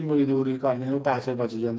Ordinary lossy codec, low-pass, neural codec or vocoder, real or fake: none; none; codec, 16 kHz, 2 kbps, FreqCodec, smaller model; fake